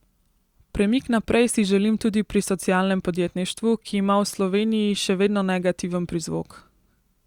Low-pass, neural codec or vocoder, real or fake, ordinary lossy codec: 19.8 kHz; none; real; Opus, 64 kbps